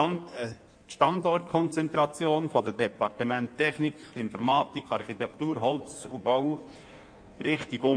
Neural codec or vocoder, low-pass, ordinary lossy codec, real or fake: codec, 16 kHz in and 24 kHz out, 1.1 kbps, FireRedTTS-2 codec; 9.9 kHz; MP3, 64 kbps; fake